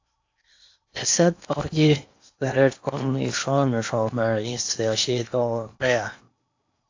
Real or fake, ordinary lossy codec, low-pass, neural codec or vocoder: fake; AAC, 48 kbps; 7.2 kHz; codec, 16 kHz in and 24 kHz out, 0.8 kbps, FocalCodec, streaming, 65536 codes